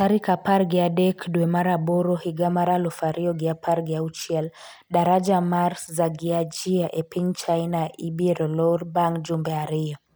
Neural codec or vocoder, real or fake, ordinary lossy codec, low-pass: none; real; none; none